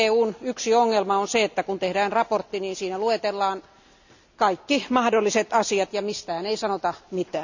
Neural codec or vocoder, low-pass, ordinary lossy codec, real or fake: none; 7.2 kHz; none; real